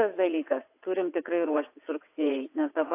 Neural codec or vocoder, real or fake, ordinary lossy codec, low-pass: vocoder, 22.05 kHz, 80 mel bands, WaveNeXt; fake; AAC, 24 kbps; 3.6 kHz